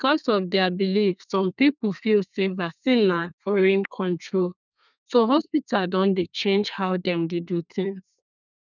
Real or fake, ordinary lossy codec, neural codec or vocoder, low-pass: fake; none; codec, 32 kHz, 1.9 kbps, SNAC; 7.2 kHz